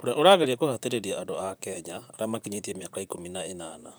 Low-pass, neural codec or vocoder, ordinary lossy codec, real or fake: none; vocoder, 44.1 kHz, 128 mel bands, Pupu-Vocoder; none; fake